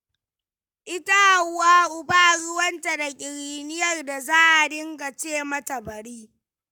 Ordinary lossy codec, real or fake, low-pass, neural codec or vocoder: none; fake; 19.8 kHz; codec, 44.1 kHz, 7.8 kbps, Pupu-Codec